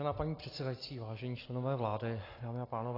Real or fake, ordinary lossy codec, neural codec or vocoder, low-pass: real; AAC, 24 kbps; none; 5.4 kHz